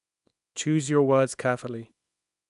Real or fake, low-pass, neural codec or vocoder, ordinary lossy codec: fake; 10.8 kHz; codec, 24 kHz, 0.9 kbps, WavTokenizer, small release; none